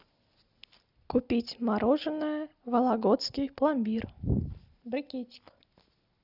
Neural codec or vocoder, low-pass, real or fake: none; 5.4 kHz; real